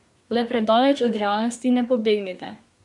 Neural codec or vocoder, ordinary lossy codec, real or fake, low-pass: codec, 24 kHz, 1 kbps, SNAC; none; fake; 10.8 kHz